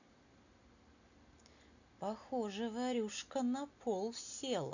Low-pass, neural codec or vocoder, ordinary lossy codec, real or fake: 7.2 kHz; none; none; real